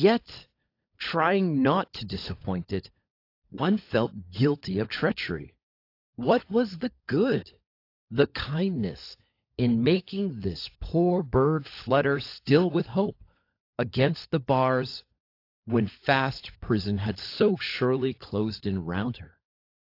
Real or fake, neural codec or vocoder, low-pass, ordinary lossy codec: fake; codec, 16 kHz, 16 kbps, FunCodec, trained on LibriTTS, 50 frames a second; 5.4 kHz; AAC, 32 kbps